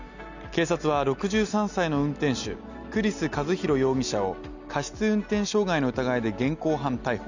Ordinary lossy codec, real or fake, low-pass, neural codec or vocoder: none; real; 7.2 kHz; none